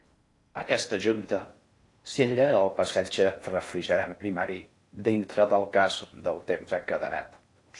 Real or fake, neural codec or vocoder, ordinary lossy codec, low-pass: fake; codec, 16 kHz in and 24 kHz out, 0.6 kbps, FocalCodec, streaming, 4096 codes; AAC, 48 kbps; 10.8 kHz